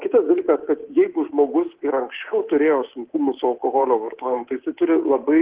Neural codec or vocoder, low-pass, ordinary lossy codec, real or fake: codec, 24 kHz, 3.1 kbps, DualCodec; 3.6 kHz; Opus, 16 kbps; fake